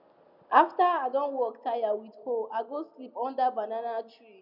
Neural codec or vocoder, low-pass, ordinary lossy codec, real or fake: none; 5.4 kHz; none; real